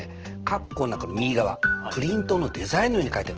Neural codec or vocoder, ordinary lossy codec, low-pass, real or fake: none; Opus, 16 kbps; 7.2 kHz; real